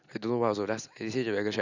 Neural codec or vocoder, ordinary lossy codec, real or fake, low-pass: none; none; real; 7.2 kHz